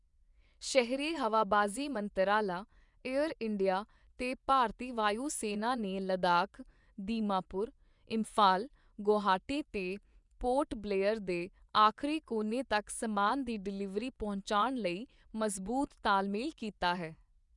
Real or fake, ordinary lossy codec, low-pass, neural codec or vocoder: fake; none; 10.8 kHz; vocoder, 24 kHz, 100 mel bands, Vocos